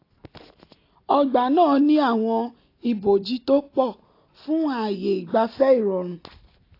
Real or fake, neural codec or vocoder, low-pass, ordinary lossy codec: real; none; 5.4 kHz; AAC, 32 kbps